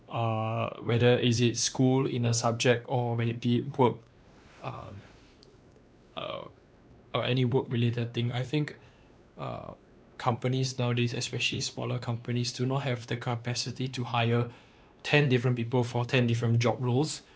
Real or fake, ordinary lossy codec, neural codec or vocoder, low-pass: fake; none; codec, 16 kHz, 2 kbps, X-Codec, WavLM features, trained on Multilingual LibriSpeech; none